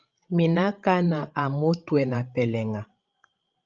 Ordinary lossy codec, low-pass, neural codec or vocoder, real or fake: Opus, 24 kbps; 7.2 kHz; codec, 16 kHz, 16 kbps, FreqCodec, larger model; fake